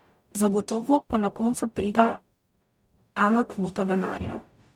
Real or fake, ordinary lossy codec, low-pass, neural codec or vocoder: fake; MP3, 96 kbps; 19.8 kHz; codec, 44.1 kHz, 0.9 kbps, DAC